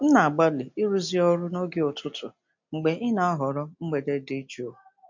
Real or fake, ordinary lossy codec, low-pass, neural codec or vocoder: real; MP3, 48 kbps; 7.2 kHz; none